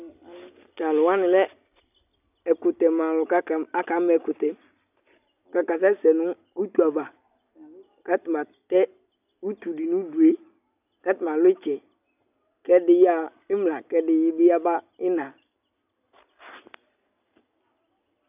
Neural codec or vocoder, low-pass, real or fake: none; 3.6 kHz; real